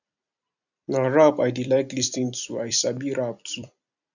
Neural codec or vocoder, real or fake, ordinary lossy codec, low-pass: vocoder, 44.1 kHz, 128 mel bands every 256 samples, BigVGAN v2; fake; none; 7.2 kHz